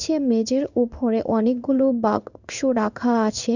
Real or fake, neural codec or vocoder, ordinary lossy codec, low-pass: fake; codec, 16 kHz in and 24 kHz out, 1 kbps, XY-Tokenizer; none; 7.2 kHz